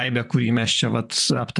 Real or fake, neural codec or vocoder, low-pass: fake; vocoder, 44.1 kHz, 128 mel bands, Pupu-Vocoder; 10.8 kHz